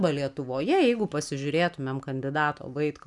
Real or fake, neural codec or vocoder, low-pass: real; none; 10.8 kHz